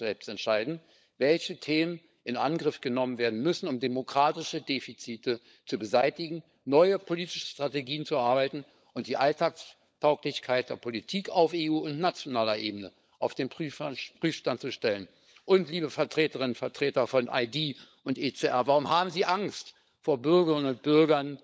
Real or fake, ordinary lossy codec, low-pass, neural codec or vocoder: fake; none; none; codec, 16 kHz, 16 kbps, FunCodec, trained on LibriTTS, 50 frames a second